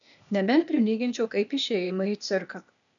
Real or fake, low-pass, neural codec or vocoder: fake; 7.2 kHz; codec, 16 kHz, 0.8 kbps, ZipCodec